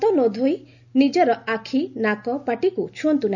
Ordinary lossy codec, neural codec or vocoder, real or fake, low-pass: none; none; real; 7.2 kHz